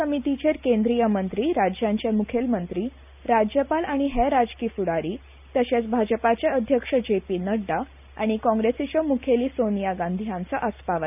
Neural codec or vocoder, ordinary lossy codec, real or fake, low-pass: none; none; real; 3.6 kHz